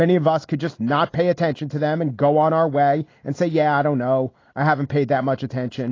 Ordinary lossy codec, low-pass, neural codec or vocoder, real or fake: AAC, 32 kbps; 7.2 kHz; none; real